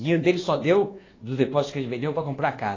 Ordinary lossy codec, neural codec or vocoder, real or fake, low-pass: AAC, 32 kbps; codec, 24 kHz, 1.2 kbps, DualCodec; fake; 7.2 kHz